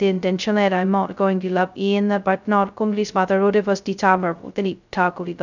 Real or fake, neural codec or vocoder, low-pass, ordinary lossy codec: fake; codec, 16 kHz, 0.2 kbps, FocalCodec; 7.2 kHz; none